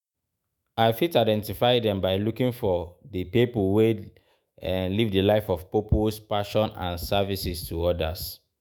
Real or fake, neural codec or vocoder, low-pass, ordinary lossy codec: fake; autoencoder, 48 kHz, 128 numbers a frame, DAC-VAE, trained on Japanese speech; none; none